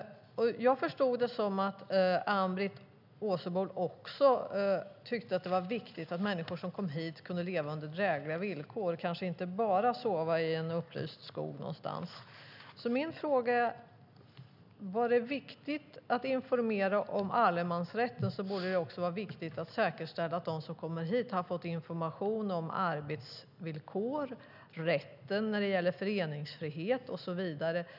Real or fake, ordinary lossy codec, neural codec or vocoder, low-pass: real; none; none; 5.4 kHz